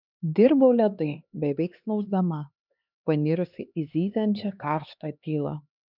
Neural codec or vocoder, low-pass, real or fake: codec, 16 kHz, 2 kbps, X-Codec, HuBERT features, trained on LibriSpeech; 5.4 kHz; fake